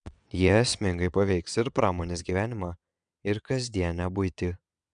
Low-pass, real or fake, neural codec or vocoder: 9.9 kHz; fake; vocoder, 22.05 kHz, 80 mel bands, WaveNeXt